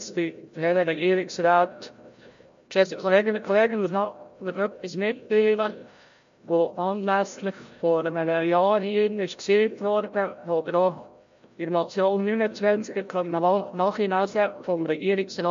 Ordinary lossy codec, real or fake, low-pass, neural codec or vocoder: MP3, 48 kbps; fake; 7.2 kHz; codec, 16 kHz, 0.5 kbps, FreqCodec, larger model